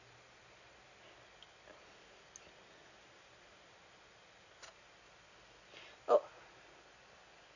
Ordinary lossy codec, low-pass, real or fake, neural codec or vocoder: none; 7.2 kHz; real; none